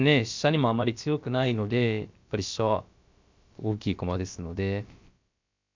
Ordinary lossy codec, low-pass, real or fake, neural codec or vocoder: MP3, 64 kbps; 7.2 kHz; fake; codec, 16 kHz, about 1 kbps, DyCAST, with the encoder's durations